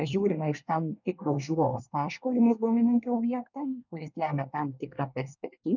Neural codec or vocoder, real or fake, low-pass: codec, 24 kHz, 1 kbps, SNAC; fake; 7.2 kHz